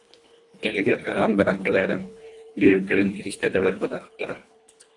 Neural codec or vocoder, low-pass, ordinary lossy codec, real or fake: codec, 24 kHz, 1.5 kbps, HILCodec; 10.8 kHz; AAC, 48 kbps; fake